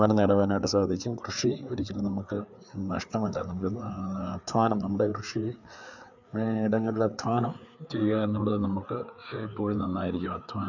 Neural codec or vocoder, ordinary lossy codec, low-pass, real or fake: codec, 16 kHz, 4 kbps, FreqCodec, larger model; none; 7.2 kHz; fake